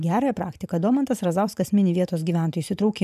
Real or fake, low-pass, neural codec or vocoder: fake; 14.4 kHz; vocoder, 44.1 kHz, 128 mel bands every 512 samples, BigVGAN v2